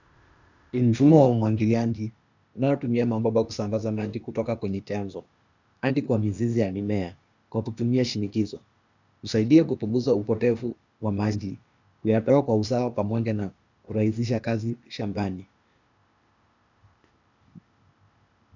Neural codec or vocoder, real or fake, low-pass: codec, 16 kHz, 0.8 kbps, ZipCodec; fake; 7.2 kHz